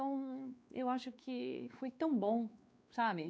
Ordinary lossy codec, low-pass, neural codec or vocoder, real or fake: none; none; codec, 16 kHz, 2 kbps, X-Codec, WavLM features, trained on Multilingual LibriSpeech; fake